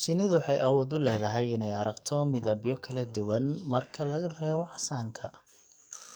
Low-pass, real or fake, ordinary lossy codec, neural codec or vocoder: none; fake; none; codec, 44.1 kHz, 2.6 kbps, SNAC